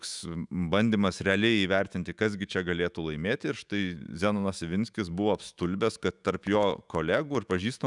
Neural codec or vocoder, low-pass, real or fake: autoencoder, 48 kHz, 128 numbers a frame, DAC-VAE, trained on Japanese speech; 10.8 kHz; fake